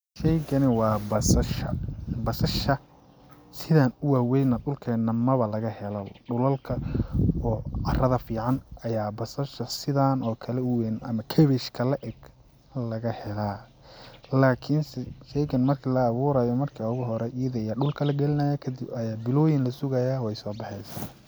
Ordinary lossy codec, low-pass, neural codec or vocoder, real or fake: none; none; none; real